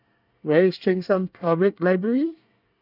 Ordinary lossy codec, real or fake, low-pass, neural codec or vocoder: none; fake; 5.4 kHz; codec, 24 kHz, 1 kbps, SNAC